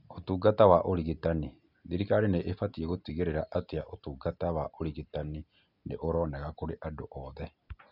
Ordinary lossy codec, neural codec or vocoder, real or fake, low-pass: none; none; real; 5.4 kHz